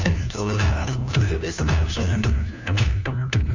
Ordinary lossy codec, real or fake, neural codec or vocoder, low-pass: none; fake; codec, 16 kHz, 1 kbps, FunCodec, trained on LibriTTS, 50 frames a second; 7.2 kHz